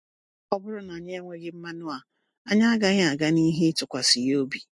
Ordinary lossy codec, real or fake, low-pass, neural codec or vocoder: MP3, 48 kbps; real; 10.8 kHz; none